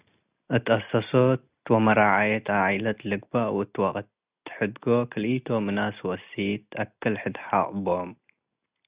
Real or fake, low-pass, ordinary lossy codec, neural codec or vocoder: real; 3.6 kHz; Opus, 64 kbps; none